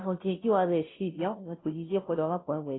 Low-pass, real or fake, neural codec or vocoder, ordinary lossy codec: 7.2 kHz; fake; codec, 16 kHz, 0.5 kbps, FunCodec, trained on Chinese and English, 25 frames a second; AAC, 16 kbps